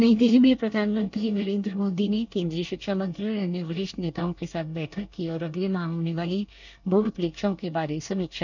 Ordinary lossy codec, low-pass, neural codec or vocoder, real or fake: none; 7.2 kHz; codec, 24 kHz, 1 kbps, SNAC; fake